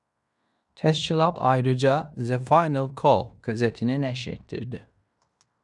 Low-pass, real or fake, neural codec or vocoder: 10.8 kHz; fake; codec, 16 kHz in and 24 kHz out, 0.9 kbps, LongCat-Audio-Codec, fine tuned four codebook decoder